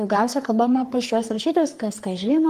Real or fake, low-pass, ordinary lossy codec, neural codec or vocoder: fake; 14.4 kHz; Opus, 24 kbps; codec, 44.1 kHz, 3.4 kbps, Pupu-Codec